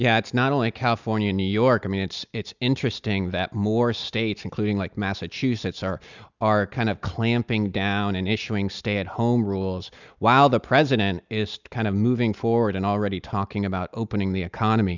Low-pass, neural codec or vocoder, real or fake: 7.2 kHz; none; real